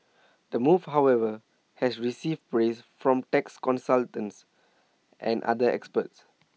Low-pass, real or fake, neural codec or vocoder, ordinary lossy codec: none; real; none; none